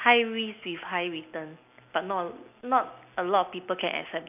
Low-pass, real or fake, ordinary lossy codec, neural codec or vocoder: 3.6 kHz; real; none; none